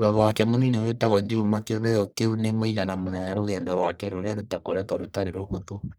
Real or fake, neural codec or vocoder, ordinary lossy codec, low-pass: fake; codec, 44.1 kHz, 1.7 kbps, Pupu-Codec; none; none